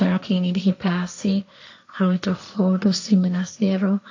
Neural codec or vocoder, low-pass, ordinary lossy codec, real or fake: codec, 16 kHz, 1.1 kbps, Voila-Tokenizer; 7.2 kHz; AAC, 48 kbps; fake